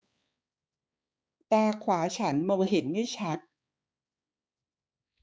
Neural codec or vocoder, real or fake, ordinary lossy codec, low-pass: codec, 16 kHz, 4 kbps, X-Codec, HuBERT features, trained on balanced general audio; fake; none; none